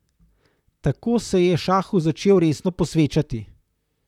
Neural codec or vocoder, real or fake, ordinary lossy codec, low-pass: vocoder, 44.1 kHz, 128 mel bands, Pupu-Vocoder; fake; none; 19.8 kHz